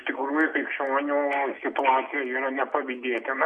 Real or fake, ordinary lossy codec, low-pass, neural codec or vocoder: real; MP3, 48 kbps; 9.9 kHz; none